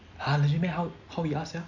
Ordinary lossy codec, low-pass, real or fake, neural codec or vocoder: none; 7.2 kHz; real; none